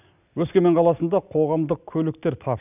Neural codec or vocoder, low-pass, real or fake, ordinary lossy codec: none; 3.6 kHz; real; none